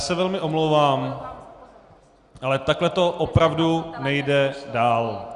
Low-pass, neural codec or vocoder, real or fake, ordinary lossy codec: 10.8 kHz; none; real; Opus, 64 kbps